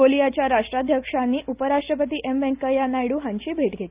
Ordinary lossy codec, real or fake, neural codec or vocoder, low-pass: Opus, 32 kbps; real; none; 3.6 kHz